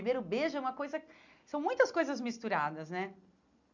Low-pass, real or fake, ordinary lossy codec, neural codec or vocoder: 7.2 kHz; real; none; none